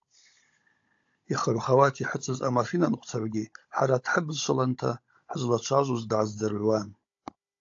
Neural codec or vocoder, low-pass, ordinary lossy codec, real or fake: codec, 16 kHz, 16 kbps, FunCodec, trained on Chinese and English, 50 frames a second; 7.2 kHz; AAC, 48 kbps; fake